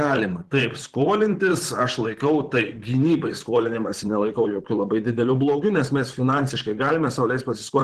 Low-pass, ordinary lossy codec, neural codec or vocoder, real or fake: 14.4 kHz; Opus, 16 kbps; vocoder, 44.1 kHz, 128 mel bands, Pupu-Vocoder; fake